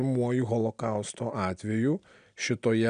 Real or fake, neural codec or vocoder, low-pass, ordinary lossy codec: real; none; 9.9 kHz; MP3, 96 kbps